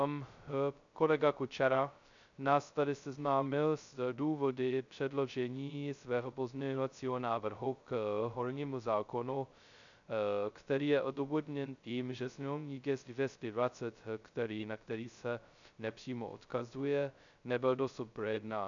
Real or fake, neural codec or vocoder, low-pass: fake; codec, 16 kHz, 0.2 kbps, FocalCodec; 7.2 kHz